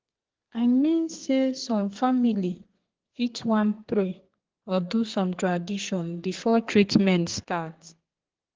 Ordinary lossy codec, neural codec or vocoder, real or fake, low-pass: Opus, 16 kbps; codec, 32 kHz, 1.9 kbps, SNAC; fake; 7.2 kHz